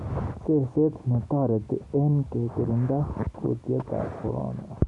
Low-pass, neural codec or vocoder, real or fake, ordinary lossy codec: 10.8 kHz; none; real; none